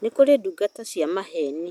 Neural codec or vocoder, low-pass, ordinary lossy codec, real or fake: none; 19.8 kHz; none; real